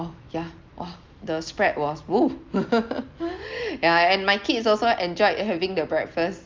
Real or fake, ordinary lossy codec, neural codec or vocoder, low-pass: real; Opus, 24 kbps; none; 7.2 kHz